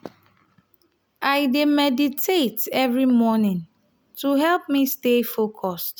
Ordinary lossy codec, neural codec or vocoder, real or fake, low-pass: none; none; real; none